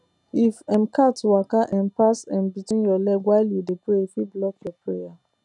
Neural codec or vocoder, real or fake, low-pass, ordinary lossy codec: none; real; 10.8 kHz; none